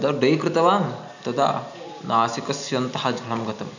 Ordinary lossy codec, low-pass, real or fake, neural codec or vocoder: none; 7.2 kHz; real; none